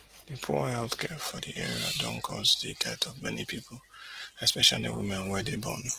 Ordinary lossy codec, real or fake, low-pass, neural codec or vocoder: Opus, 16 kbps; real; 14.4 kHz; none